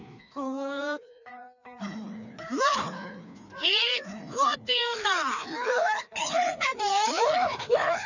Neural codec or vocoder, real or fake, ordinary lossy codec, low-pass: codec, 16 kHz, 2 kbps, FreqCodec, larger model; fake; none; 7.2 kHz